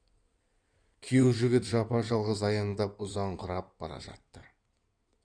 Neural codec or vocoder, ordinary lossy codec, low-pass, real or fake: codec, 16 kHz in and 24 kHz out, 2.2 kbps, FireRedTTS-2 codec; none; 9.9 kHz; fake